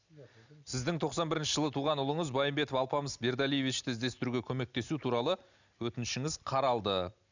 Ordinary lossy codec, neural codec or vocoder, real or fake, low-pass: none; none; real; 7.2 kHz